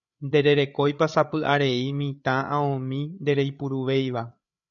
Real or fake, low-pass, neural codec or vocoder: fake; 7.2 kHz; codec, 16 kHz, 8 kbps, FreqCodec, larger model